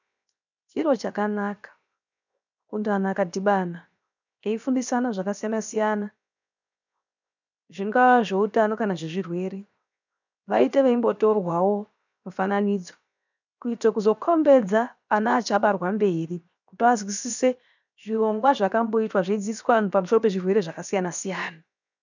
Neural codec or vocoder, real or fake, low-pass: codec, 16 kHz, 0.7 kbps, FocalCodec; fake; 7.2 kHz